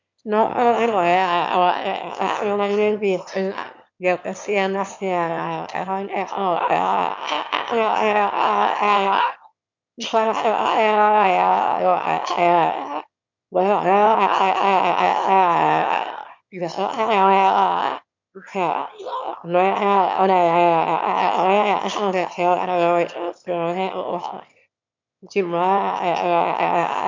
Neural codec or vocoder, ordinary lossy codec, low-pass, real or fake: autoencoder, 22.05 kHz, a latent of 192 numbers a frame, VITS, trained on one speaker; MP3, 64 kbps; 7.2 kHz; fake